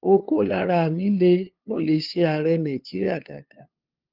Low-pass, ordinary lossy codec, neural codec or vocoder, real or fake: 5.4 kHz; Opus, 32 kbps; codec, 16 kHz, 2 kbps, FunCodec, trained on LibriTTS, 25 frames a second; fake